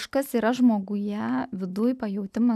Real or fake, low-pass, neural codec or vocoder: real; 14.4 kHz; none